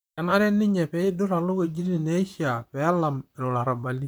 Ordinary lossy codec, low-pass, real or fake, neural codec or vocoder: none; none; fake; vocoder, 44.1 kHz, 128 mel bands, Pupu-Vocoder